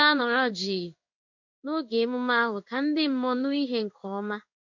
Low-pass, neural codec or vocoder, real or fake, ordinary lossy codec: 7.2 kHz; codec, 16 kHz in and 24 kHz out, 1 kbps, XY-Tokenizer; fake; MP3, 48 kbps